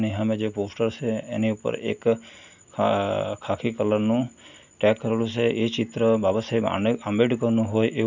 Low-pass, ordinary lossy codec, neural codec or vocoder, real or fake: 7.2 kHz; none; none; real